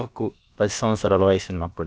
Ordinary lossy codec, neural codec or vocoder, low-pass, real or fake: none; codec, 16 kHz, about 1 kbps, DyCAST, with the encoder's durations; none; fake